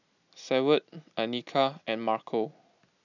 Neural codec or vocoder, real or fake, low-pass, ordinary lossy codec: none; real; 7.2 kHz; none